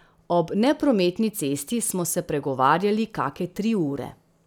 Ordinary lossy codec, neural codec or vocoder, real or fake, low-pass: none; none; real; none